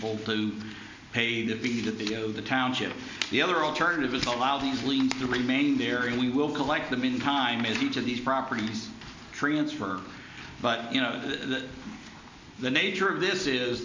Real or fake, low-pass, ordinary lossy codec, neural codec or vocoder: real; 7.2 kHz; MP3, 64 kbps; none